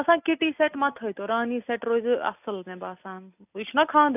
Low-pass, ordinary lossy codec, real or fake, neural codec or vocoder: 3.6 kHz; none; real; none